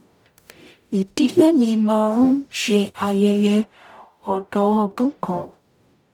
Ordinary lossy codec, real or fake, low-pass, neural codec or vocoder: none; fake; 19.8 kHz; codec, 44.1 kHz, 0.9 kbps, DAC